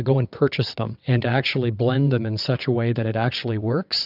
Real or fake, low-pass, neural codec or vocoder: fake; 5.4 kHz; vocoder, 22.05 kHz, 80 mel bands, WaveNeXt